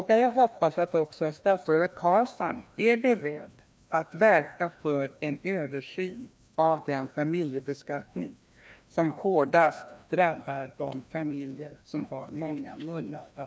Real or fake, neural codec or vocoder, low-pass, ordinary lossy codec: fake; codec, 16 kHz, 1 kbps, FreqCodec, larger model; none; none